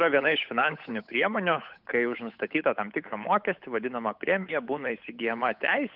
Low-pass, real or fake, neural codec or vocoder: 5.4 kHz; fake; codec, 16 kHz, 8 kbps, FunCodec, trained on Chinese and English, 25 frames a second